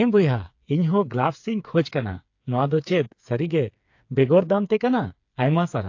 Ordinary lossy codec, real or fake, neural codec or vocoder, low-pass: AAC, 48 kbps; fake; codec, 16 kHz, 4 kbps, FreqCodec, smaller model; 7.2 kHz